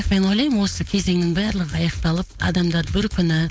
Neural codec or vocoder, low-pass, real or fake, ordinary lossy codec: codec, 16 kHz, 4.8 kbps, FACodec; none; fake; none